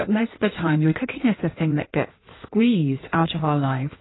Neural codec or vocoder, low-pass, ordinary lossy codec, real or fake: codec, 16 kHz in and 24 kHz out, 1.1 kbps, FireRedTTS-2 codec; 7.2 kHz; AAC, 16 kbps; fake